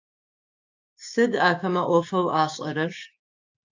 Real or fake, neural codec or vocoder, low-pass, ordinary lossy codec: fake; codec, 16 kHz, 6 kbps, DAC; 7.2 kHz; AAC, 48 kbps